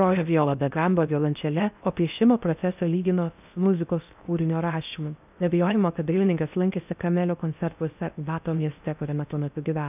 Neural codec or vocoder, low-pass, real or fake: codec, 16 kHz in and 24 kHz out, 0.6 kbps, FocalCodec, streaming, 4096 codes; 3.6 kHz; fake